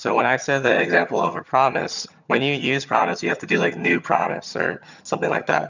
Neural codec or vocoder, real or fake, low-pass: vocoder, 22.05 kHz, 80 mel bands, HiFi-GAN; fake; 7.2 kHz